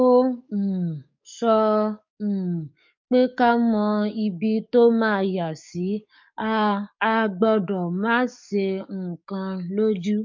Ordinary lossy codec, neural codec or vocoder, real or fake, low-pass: MP3, 48 kbps; codec, 44.1 kHz, 7.8 kbps, DAC; fake; 7.2 kHz